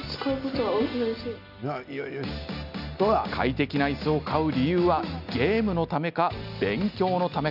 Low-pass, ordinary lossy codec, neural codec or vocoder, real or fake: 5.4 kHz; none; none; real